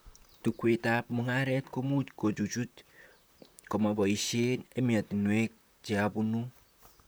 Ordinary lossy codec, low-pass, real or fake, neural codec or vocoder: none; none; fake; vocoder, 44.1 kHz, 128 mel bands, Pupu-Vocoder